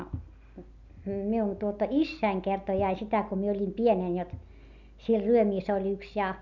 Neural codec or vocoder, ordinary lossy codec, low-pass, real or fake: none; none; 7.2 kHz; real